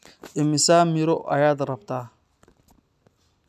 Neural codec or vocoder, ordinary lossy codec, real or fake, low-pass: none; none; real; 14.4 kHz